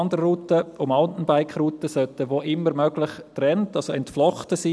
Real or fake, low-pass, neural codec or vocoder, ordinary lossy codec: real; none; none; none